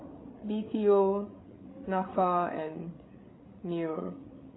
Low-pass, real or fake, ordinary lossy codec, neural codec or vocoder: 7.2 kHz; fake; AAC, 16 kbps; codec, 16 kHz, 8 kbps, FreqCodec, larger model